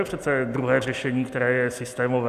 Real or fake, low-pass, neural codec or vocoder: real; 14.4 kHz; none